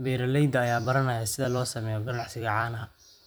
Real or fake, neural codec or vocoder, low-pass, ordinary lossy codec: fake; vocoder, 44.1 kHz, 128 mel bands every 256 samples, BigVGAN v2; none; none